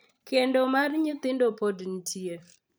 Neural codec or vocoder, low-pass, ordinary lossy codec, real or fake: vocoder, 44.1 kHz, 128 mel bands every 512 samples, BigVGAN v2; none; none; fake